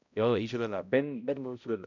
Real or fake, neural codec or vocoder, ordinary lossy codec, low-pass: fake; codec, 16 kHz, 0.5 kbps, X-Codec, HuBERT features, trained on balanced general audio; none; 7.2 kHz